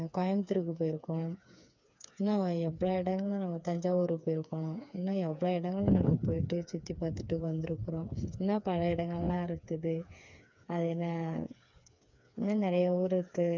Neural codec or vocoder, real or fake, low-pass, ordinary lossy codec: codec, 16 kHz, 4 kbps, FreqCodec, smaller model; fake; 7.2 kHz; MP3, 64 kbps